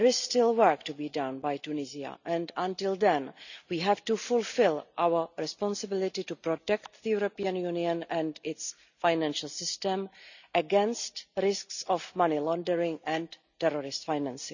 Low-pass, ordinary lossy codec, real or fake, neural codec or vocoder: 7.2 kHz; none; real; none